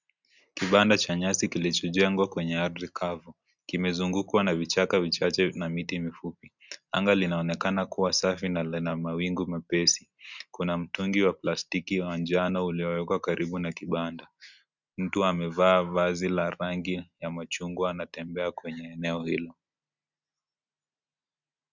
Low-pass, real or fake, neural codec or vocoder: 7.2 kHz; real; none